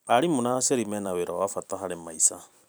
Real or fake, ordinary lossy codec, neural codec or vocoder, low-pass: fake; none; vocoder, 44.1 kHz, 128 mel bands every 256 samples, BigVGAN v2; none